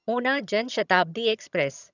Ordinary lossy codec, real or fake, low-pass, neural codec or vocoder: none; fake; 7.2 kHz; vocoder, 22.05 kHz, 80 mel bands, HiFi-GAN